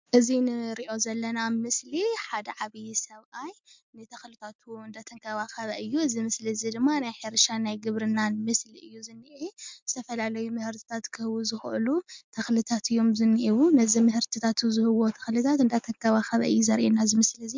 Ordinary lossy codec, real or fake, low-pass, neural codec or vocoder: MP3, 64 kbps; real; 7.2 kHz; none